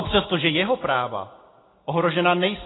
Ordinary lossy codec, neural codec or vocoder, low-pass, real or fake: AAC, 16 kbps; none; 7.2 kHz; real